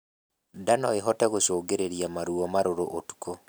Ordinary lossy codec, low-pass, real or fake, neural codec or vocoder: none; none; real; none